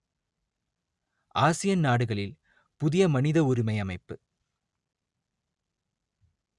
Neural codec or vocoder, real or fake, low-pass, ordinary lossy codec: none; real; 10.8 kHz; Opus, 64 kbps